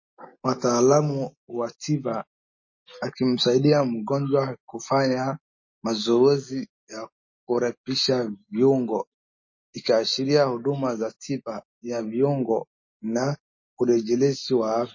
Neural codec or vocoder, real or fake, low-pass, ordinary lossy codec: none; real; 7.2 kHz; MP3, 32 kbps